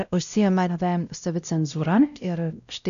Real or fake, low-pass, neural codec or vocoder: fake; 7.2 kHz; codec, 16 kHz, 0.5 kbps, X-Codec, WavLM features, trained on Multilingual LibriSpeech